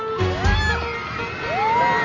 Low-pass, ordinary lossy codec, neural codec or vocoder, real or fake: 7.2 kHz; none; none; real